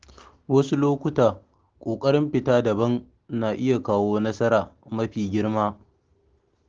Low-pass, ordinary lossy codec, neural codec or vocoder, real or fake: 7.2 kHz; Opus, 16 kbps; none; real